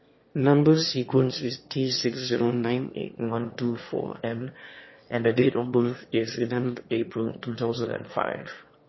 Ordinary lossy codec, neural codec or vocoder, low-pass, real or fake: MP3, 24 kbps; autoencoder, 22.05 kHz, a latent of 192 numbers a frame, VITS, trained on one speaker; 7.2 kHz; fake